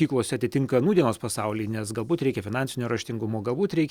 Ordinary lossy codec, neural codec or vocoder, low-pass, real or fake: Opus, 64 kbps; none; 19.8 kHz; real